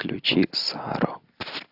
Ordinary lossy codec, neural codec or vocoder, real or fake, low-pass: AAC, 48 kbps; none; real; 5.4 kHz